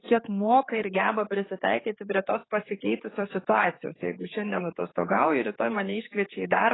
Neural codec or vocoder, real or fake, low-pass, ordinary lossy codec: codec, 16 kHz, 4 kbps, X-Codec, HuBERT features, trained on general audio; fake; 7.2 kHz; AAC, 16 kbps